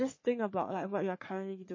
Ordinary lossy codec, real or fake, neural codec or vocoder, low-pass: MP3, 32 kbps; fake; codec, 44.1 kHz, 3.4 kbps, Pupu-Codec; 7.2 kHz